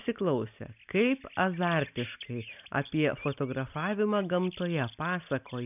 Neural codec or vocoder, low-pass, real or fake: none; 3.6 kHz; real